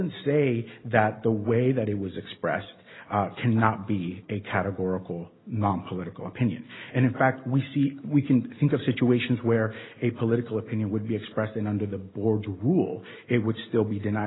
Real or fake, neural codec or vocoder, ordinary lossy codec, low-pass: real; none; AAC, 16 kbps; 7.2 kHz